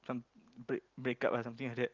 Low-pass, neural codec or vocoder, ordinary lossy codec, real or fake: 7.2 kHz; none; Opus, 24 kbps; real